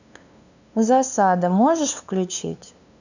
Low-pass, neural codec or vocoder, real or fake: 7.2 kHz; codec, 16 kHz, 2 kbps, FunCodec, trained on LibriTTS, 25 frames a second; fake